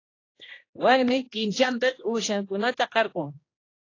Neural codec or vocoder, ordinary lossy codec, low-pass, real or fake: codec, 16 kHz, 1 kbps, X-Codec, HuBERT features, trained on general audio; AAC, 32 kbps; 7.2 kHz; fake